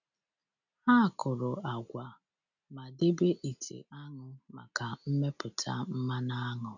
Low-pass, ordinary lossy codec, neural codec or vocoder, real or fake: 7.2 kHz; none; none; real